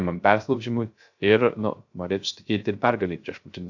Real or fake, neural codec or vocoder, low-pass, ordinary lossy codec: fake; codec, 16 kHz, 0.3 kbps, FocalCodec; 7.2 kHz; AAC, 48 kbps